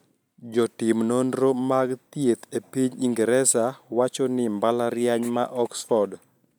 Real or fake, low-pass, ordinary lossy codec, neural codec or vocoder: real; none; none; none